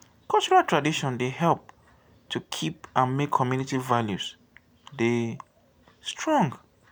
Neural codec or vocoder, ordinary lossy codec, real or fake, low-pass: none; none; real; none